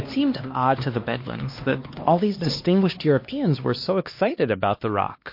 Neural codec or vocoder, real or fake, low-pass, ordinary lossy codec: codec, 16 kHz, 2 kbps, X-Codec, HuBERT features, trained on LibriSpeech; fake; 5.4 kHz; MP3, 32 kbps